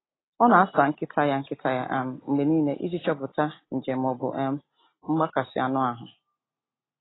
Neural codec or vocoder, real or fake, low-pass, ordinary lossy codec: none; real; 7.2 kHz; AAC, 16 kbps